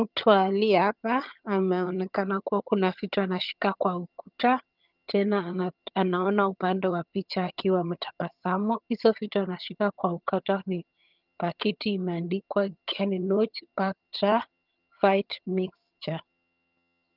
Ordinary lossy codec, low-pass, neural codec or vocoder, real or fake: Opus, 24 kbps; 5.4 kHz; vocoder, 22.05 kHz, 80 mel bands, HiFi-GAN; fake